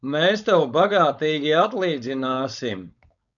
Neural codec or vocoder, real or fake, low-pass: codec, 16 kHz, 4.8 kbps, FACodec; fake; 7.2 kHz